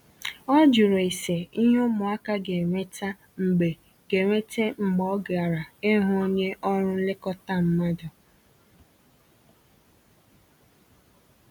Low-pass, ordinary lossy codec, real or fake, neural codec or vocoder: 19.8 kHz; none; real; none